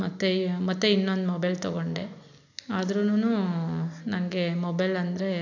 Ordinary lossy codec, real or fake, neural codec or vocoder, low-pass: none; real; none; 7.2 kHz